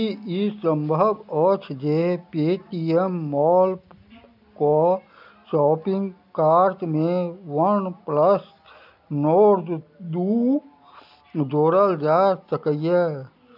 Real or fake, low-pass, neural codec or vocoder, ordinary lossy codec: real; 5.4 kHz; none; none